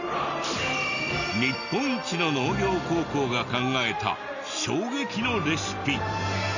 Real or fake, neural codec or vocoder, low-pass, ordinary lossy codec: real; none; 7.2 kHz; none